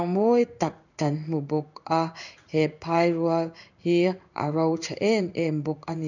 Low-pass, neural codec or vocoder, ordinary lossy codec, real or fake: 7.2 kHz; codec, 16 kHz in and 24 kHz out, 1 kbps, XY-Tokenizer; none; fake